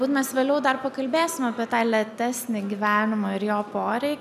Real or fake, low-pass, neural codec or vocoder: real; 14.4 kHz; none